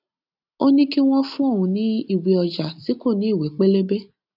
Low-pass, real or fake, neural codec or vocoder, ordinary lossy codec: 5.4 kHz; real; none; none